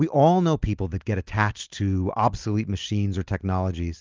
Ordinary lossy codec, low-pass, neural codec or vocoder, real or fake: Opus, 32 kbps; 7.2 kHz; none; real